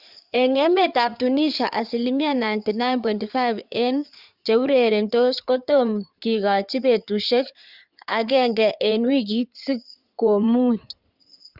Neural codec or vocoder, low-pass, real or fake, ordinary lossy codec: codec, 16 kHz in and 24 kHz out, 2.2 kbps, FireRedTTS-2 codec; 5.4 kHz; fake; Opus, 64 kbps